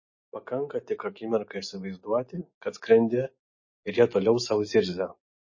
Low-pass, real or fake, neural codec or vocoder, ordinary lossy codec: 7.2 kHz; real; none; MP3, 32 kbps